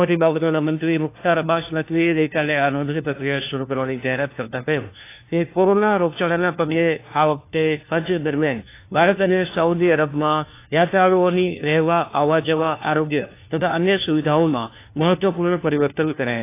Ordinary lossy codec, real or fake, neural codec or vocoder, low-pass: AAC, 24 kbps; fake; codec, 16 kHz, 1 kbps, FunCodec, trained on LibriTTS, 50 frames a second; 3.6 kHz